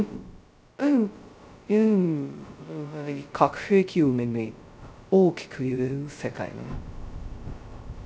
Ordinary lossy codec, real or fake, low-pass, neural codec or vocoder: none; fake; none; codec, 16 kHz, 0.2 kbps, FocalCodec